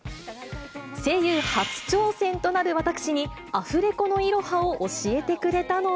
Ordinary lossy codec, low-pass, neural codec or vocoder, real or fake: none; none; none; real